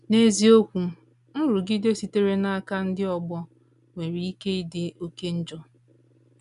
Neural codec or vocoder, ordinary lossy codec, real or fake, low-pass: none; none; real; 10.8 kHz